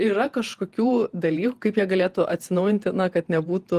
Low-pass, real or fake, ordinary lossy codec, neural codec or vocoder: 14.4 kHz; fake; Opus, 24 kbps; vocoder, 48 kHz, 128 mel bands, Vocos